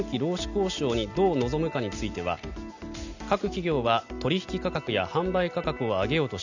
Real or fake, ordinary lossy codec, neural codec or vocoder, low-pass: real; none; none; 7.2 kHz